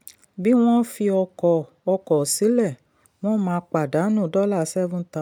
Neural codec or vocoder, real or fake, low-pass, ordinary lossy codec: none; real; 19.8 kHz; none